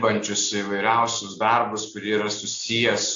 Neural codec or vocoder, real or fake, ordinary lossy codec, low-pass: none; real; AAC, 48 kbps; 7.2 kHz